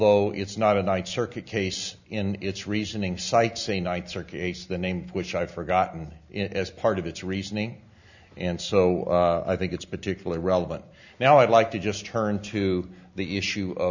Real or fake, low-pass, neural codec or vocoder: real; 7.2 kHz; none